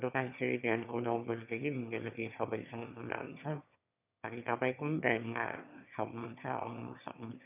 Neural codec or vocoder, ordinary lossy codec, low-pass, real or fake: autoencoder, 22.05 kHz, a latent of 192 numbers a frame, VITS, trained on one speaker; none; 3.6 kHz; fake